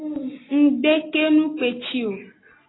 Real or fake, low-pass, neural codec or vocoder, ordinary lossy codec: real; 7.2 kHz; none; AAC, 16 kbps